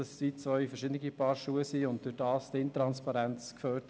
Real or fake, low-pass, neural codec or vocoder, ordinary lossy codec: real; none; none; none